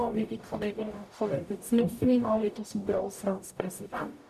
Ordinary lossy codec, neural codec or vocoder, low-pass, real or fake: none; codec, 44.1 kHz, 0.9 kbps, DAC; 14.4 kHz; fake